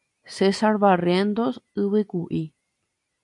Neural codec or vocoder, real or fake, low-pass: none; real; 10.8 kHz